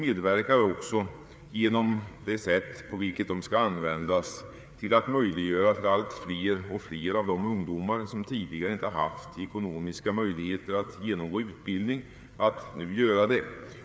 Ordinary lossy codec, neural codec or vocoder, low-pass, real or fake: none; codec, 16 kHz, 4 kbps, FreqCodec, larger model; none; fake